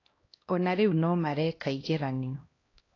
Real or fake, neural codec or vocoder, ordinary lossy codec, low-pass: fake; codec, 16 kHz, 1 kbps, X-Codec, HuBERT features, trained on LibriSpeech; AAC, 32 kbps; 7.2 kHz